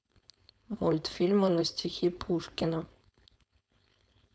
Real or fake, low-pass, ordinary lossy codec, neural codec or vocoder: fake; none; none; codec, 16 kHz, 4.8 kbps, FACodec